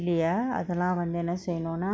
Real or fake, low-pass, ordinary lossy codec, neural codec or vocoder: real; none; none; none